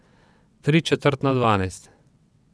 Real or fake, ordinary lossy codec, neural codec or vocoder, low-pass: fake; none; vocoder, 22.05 kHz, 80 mel bands, WaveNeXt; none